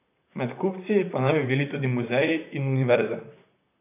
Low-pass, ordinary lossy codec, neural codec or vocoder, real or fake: 3.6 kHz; none; vocoder, 44.1 kHz, 128 mel bands, Pupu-Vocoder; fake